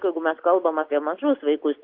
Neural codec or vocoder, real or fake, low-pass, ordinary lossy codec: none; real; 5.4 kHz; Opus, 32 kbps